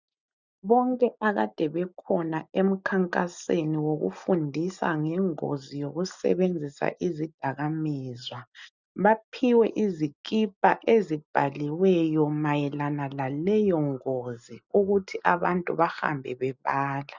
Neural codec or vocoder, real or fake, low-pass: none; real; 7.2 kHz